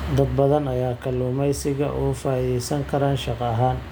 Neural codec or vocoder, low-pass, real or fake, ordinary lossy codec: none; none; real; none